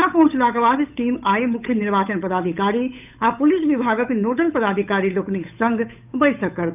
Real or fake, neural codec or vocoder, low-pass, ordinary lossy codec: fake; codec, 16 kHz, 8 kbps, FunCodec, trained on Chinese and English, 25 frames a second; 3.6 kHz; none